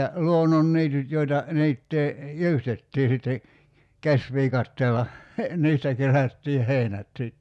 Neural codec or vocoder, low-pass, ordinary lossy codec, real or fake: none; none; none; real